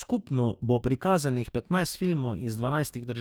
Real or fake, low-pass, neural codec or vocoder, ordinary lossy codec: fake; none; codec, 44.1 kHz, 2.6 kbps, DAC; none